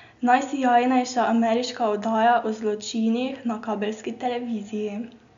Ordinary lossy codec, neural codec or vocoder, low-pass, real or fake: MP3, 64 kbps; none; 7.2 kHz; real